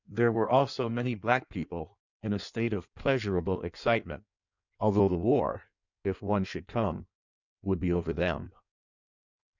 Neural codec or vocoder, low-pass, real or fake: codec, 16 kHz in and 24 kHz out, 1.1 kbps, FireRedTTS-2 codec; 7.2 kHz; fake